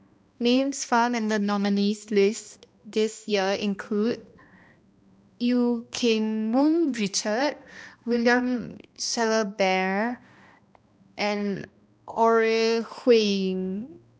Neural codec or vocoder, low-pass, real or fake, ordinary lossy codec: codec, 16 kHz, 1 kbps, X-Codec, HuBERT features, trained on balanced general audio; none; fake; none